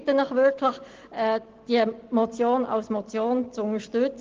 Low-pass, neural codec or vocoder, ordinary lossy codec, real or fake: 7.2 kHz; none; Opus, 16 kbps; real